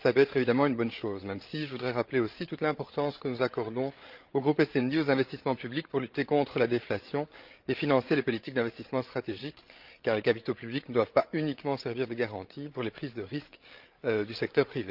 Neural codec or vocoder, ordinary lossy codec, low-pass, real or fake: codec, 16 kHz, 16 kbps, FreqCodec, larger model; Opus, 32 kbps; 5.4 kHz; fake